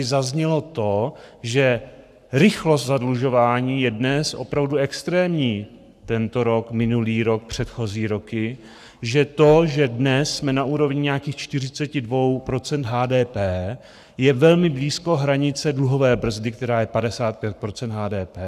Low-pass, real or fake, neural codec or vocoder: 14.4 kHz; fake; codec, 44.1 kHz, 7.8 kbps, Pupu-Codec